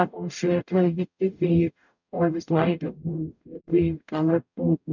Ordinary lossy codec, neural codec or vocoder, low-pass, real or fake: none; codec, 44.1 kHz, 0.9 kbps, DAC; 7.2 kHz; fake